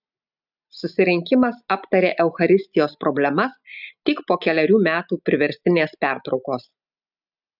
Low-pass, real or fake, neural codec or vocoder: 5.4 kHz; real; none